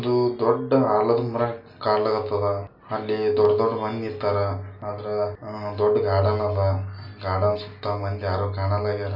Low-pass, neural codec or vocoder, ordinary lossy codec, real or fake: 5.4 kHz; none; AAC, 48 kbps; real